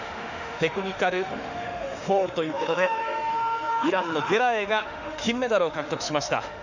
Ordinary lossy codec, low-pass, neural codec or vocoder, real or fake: none; 7.2 kHz; autoencoder, 48 kHz, 32 numbers a frame, DAC-VAE, trained on Japanese speech; fake